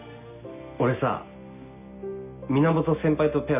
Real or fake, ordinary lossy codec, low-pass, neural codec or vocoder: real; none; 3.6 kHz; none